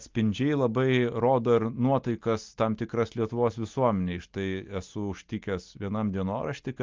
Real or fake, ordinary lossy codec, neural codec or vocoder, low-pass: real; Opus, 32 kbps; none; 7.2 kHz